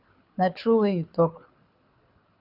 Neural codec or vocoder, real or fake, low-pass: codec, 24 kHz, 6 kbps, HILCodec; fake; 5.4 kHz